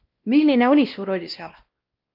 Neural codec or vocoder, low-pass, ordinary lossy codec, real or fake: codec, 16 kHz, 1 kbps, X-Codec, WavLM features, trained on Multilingual LibriSpeech; 5.4 kHz; Opus, 32 kbps; fake